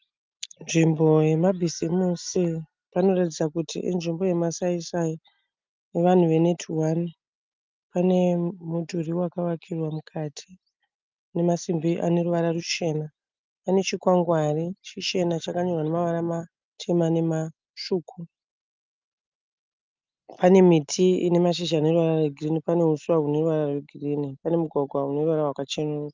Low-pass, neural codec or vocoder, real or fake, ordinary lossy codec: 7.2 kHz; none; real; Opus, 24 kbps